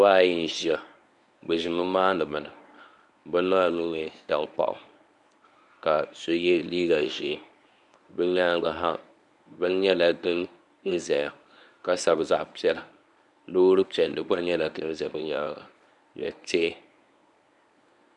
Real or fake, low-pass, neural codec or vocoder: fake; 10.8 kHz; codec, 24 kHz, 0.9 kbps, WavTokenizer, medium speech release version 1